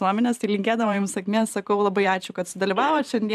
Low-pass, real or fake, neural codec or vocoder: 14.4 kHz; fake; vocoder, 44.1 kHz, 128 mel bands every 512 samples, BigVGAN v2